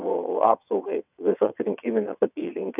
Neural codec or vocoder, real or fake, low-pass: vocoder, 22.05 kHz, 80 mel bands, WaveNeXt; fake; 3.6 kHz